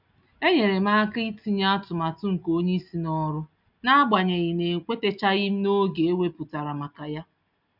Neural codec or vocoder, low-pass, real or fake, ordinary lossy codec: none; 5.4 kHz; real; none